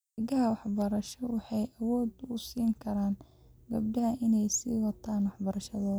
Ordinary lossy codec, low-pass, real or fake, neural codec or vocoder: none; none; real; none